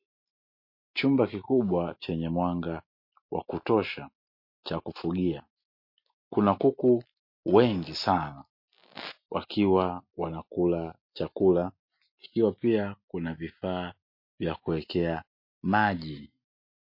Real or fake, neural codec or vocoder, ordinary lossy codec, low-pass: real; none; MP3, 32 kbps; 5.4 kHz